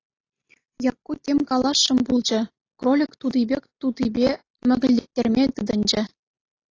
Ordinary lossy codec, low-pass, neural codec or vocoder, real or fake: AAC, 32 kbps; 7.2 kHz; none; real